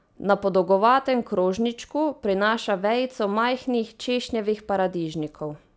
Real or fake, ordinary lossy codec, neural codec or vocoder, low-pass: real; none; none; none